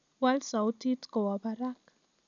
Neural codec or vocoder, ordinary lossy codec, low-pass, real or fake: none; none; 7.2 kHz; real